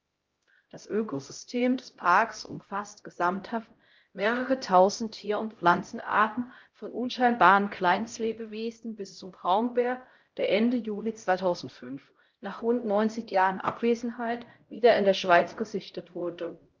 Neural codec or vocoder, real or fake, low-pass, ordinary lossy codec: codec, 16 kHz, 0.5 kbps, X-Codec, HuBERT features, trained on LibriSpeech; fake; 7.2 kHz; Opus, 32 kbps